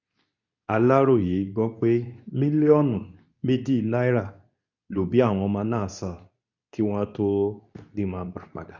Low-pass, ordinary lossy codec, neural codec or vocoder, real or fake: 7.2 kHz; none; codec, 24 kHz, 0.9 kbps, WavTokenizer, medium speech release version 2; fake